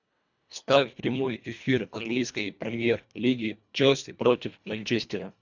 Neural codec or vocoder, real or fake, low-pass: codec, 24 kHz, 1.5 kbps, HILCodec; fake; 7.2 kHz